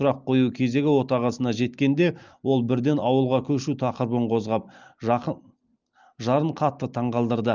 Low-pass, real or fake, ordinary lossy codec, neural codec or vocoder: 7.2 kHz; real; Opus, 24 kbps; none